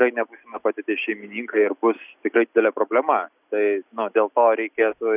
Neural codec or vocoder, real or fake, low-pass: none; real; 3.6 kHz